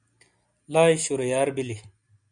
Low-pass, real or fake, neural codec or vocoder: 9.9 kHz; real; none